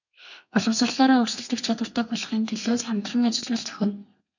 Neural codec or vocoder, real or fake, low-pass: codec, 32 kHz, 1.9 kbps, SNAC; fake; 7.2 kHz